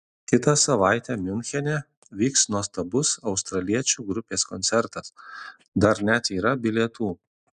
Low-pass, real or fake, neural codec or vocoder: 10.8 kHz; real; none